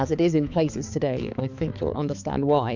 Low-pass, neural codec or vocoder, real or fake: 7.2 kHz; codec, 16 kHz, 4 kbps, X-Codec, HuBERT features, trained on balanced general audio; fake